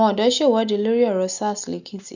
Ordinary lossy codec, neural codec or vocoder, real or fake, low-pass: none; none; real; 7.2 kHz